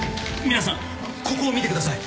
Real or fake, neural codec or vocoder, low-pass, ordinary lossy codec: real; none; none; none